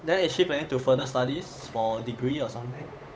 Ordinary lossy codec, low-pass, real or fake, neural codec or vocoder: none; none; fake; codec, 16 kHz, 8 kbps, FunCodec, trained on Chinese and English, 25 frames a second